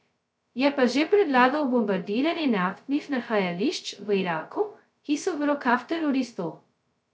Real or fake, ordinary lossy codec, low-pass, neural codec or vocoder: fake; none; none; codec, 16 kHz, 0.2 kbps, FocalCodec